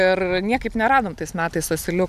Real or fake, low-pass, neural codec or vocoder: real; 14.4 kHz; none